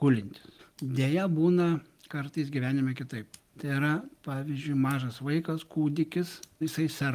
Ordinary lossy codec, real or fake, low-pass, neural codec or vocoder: Opus, 32 kbps; real; 14.4 kHz; none